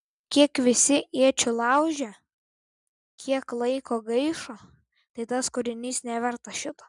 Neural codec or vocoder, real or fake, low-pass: none; real; 10.8 kHz